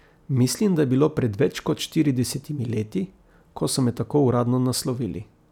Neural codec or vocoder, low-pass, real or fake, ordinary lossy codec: none; 19.8 kHz; real; none